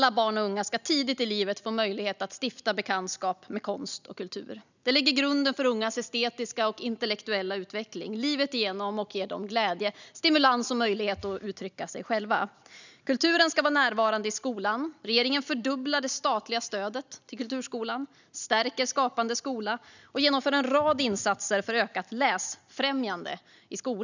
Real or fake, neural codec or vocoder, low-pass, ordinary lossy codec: real; none; 7.2 kHz; none